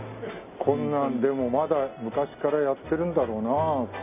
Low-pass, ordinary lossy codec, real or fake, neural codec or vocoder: 3.6 kHz; none; real; none